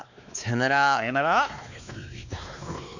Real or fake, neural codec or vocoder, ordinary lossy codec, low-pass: fake; codec, 16 kHz, 2 kbps, X-Codec, WavLM features, trained on Multilingual LibriSpeech; none; 7.2 kHz